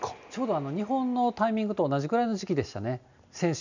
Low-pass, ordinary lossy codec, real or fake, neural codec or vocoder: 7.2 kHz; none; real; none